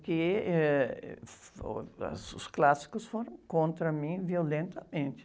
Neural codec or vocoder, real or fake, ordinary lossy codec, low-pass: none; real; none; none